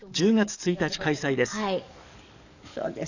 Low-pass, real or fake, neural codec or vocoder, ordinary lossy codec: 7.2 kHz; fake; codec, 44.1 kHz, 7.8 kbps, DAC; none